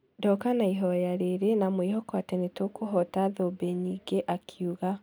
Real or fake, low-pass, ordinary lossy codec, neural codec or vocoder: real; none; none; none